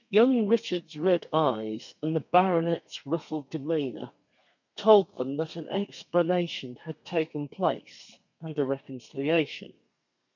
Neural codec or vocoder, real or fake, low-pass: codec, 32 kHz, 1.9 kbps, SNAC; fake; 7.2 kHz